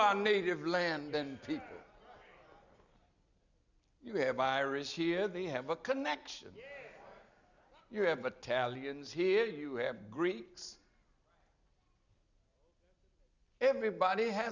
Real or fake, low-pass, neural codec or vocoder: real; 7.2 kHz; none